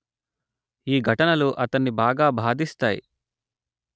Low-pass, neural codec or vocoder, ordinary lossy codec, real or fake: none; none; none; real